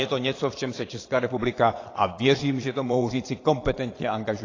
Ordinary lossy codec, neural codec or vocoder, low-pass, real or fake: AAC, 32 kbps; vocoder, 22.05 kHz, 80 mel bands, Vocos; 7.2 kHz; fake